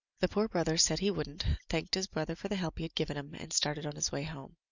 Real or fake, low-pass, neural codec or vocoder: real; 7.2 kHz; none